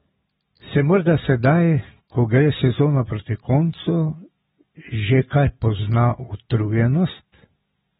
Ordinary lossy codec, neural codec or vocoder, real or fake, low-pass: AAC, 16 kbps; none; real; 19.8 kHz